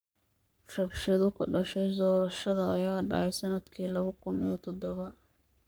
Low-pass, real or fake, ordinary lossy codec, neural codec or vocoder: none; fake; none; codec, 44.1 kHz, 3.4 kbps, Pupu-Codec